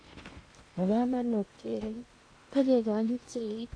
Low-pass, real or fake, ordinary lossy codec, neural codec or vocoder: 9.9 kHz; fake; AAC, 48 kbps; codec, 16 kHz in and 24 kHz out, 0.8 kbps, FocalCodec, streaming, 65536 codes